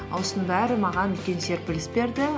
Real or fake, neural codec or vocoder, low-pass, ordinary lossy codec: real; none; none; none